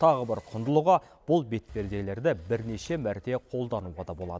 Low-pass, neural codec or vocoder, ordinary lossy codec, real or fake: none; none; none; real